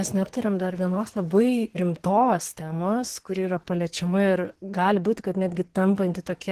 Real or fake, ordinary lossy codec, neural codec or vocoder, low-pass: fake; Opus, 16 kbps; codec, 44.1 kHz, 2.6 kbps, SNAC; 14.4 kHz